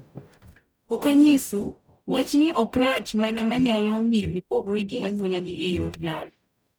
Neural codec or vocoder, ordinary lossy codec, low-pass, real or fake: codec, 44.1 kHz, 0.9 kbps, DAC; none; none; fake